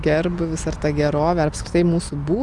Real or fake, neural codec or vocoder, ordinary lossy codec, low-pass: real; none; Opus, 32 kbps; 10.8 kHz